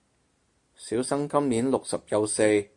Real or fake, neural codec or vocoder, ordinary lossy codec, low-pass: real; none; AAC, 64 kbps; 10.8 kHz